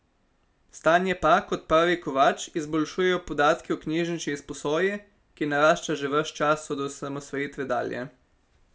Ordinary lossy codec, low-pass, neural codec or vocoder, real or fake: none; none; none; real